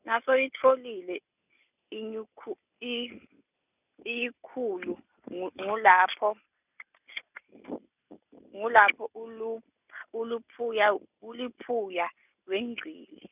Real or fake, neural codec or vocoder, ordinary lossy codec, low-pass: real; none; none; 3.6 kHz